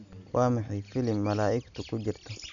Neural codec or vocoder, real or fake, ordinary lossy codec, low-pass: none; real; none; 7.2 kHz